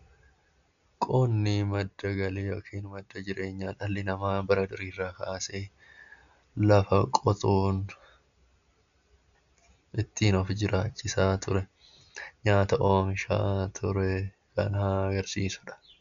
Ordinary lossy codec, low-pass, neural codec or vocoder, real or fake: MP3, 96 kbps; 7.2 kHz; none; real